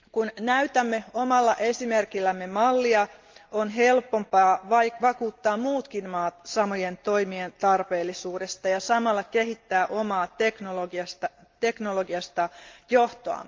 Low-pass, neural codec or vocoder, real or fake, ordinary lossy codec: 7.2 kHz; none; real; Opus, 24 kbps